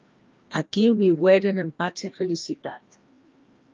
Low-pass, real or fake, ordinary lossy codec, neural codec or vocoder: 7.2 kHz; fake; Opus, 32 kbps; codec, 16 kHz, 1 kbps, FreqCodec, larger model